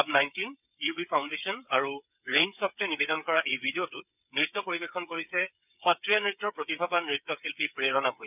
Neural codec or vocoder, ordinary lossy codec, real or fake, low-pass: codec, 16 kHz, 8 kbps, FreqCodec, smaller model; none; fake; 3.6 kHz